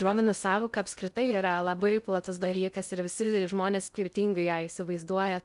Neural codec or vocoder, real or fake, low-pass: codec, 16 kHz in and 24 kHz out, 0.6 kbps, FocalCodec, streaming, 2048 codes; fake; 10.8 kHz